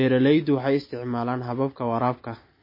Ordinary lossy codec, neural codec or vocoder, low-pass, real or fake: MP3, 24 kbps; none; 5.4 kHz; real